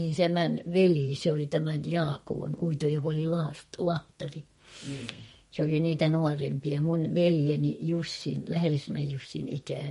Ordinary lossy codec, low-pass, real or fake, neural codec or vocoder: MP3, 48 kbps; 14.4 kHz; fake; codec, 32 kHz, 1.9 kbps, SNAC